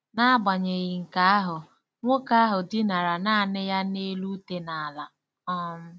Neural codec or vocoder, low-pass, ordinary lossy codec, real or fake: none; none; none; real